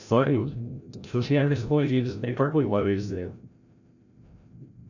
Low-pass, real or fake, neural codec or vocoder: 7.2 kHz; fake; codec, 16 kHz, 0.5 kbps, FreqCodec, larger model